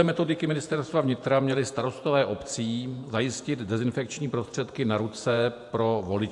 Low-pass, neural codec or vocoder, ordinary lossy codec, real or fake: 10.8 kHz; vocoder, 48 kHz, 128 mel bands, Vocos; AAC, 64 kbps; fake